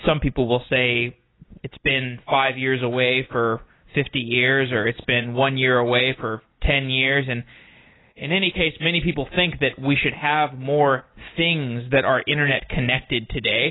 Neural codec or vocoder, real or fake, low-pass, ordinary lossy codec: none; real; 7.2 kHz; AAC, 16 kbps